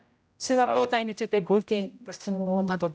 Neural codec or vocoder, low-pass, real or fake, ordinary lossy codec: codec, 16 kHz, 0.5 kbps, X-Codec, HuBERT features, trained on general audio; none; fake; none